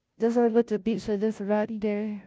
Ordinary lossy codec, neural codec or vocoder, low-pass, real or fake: none; codec, 16 kHz, 0.5 kbps, FunCodec, trained on Chinese and English, 25 frames a second; none; fake